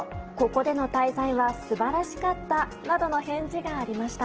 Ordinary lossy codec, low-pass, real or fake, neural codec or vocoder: Opus, 16 kbps; 7.2 kHz; real; none